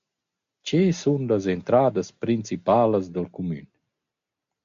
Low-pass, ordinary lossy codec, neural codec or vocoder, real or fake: 7.2 kHz; AAC, 64 kbps; none; real